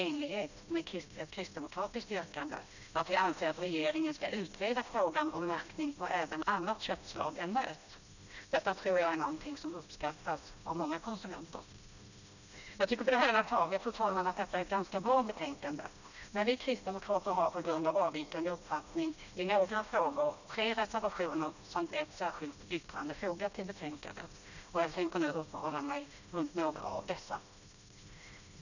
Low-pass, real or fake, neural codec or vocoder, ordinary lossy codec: 7.2 kHz; fake; codec, 16 kHz, 1 kbps, FreqCodec, smaller model; none